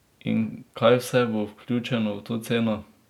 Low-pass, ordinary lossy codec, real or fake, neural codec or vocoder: 19.8 kHz; none; real; none